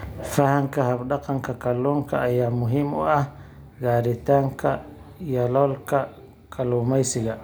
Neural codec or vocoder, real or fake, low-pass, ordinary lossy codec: none; real; none; none